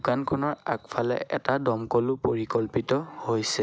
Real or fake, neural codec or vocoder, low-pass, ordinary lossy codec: real; none; none; none